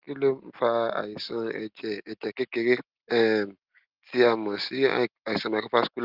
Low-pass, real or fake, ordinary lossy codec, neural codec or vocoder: 5.4 kHz; real; Opus, 32 kbps; none